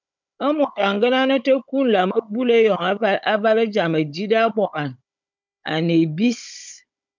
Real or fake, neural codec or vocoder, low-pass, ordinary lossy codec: fake; codec, 16 kHz, 16 kbps, FunCodec, trained on Chinese and English, 50 frames a second; 7.2 kHz; MP3, 64 kbps